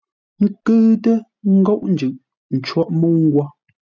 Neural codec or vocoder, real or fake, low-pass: none; real; 7.2 kHz